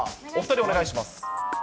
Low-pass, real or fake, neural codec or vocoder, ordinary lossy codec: none; real; none; none